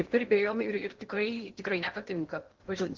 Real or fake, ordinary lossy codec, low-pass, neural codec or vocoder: fake; Opus, 16 kbps; 7.2 kHz; codec, 16 kHz in and 24 kHz out, 0.8 kbps, FocalCodec, streaming, 65536 codes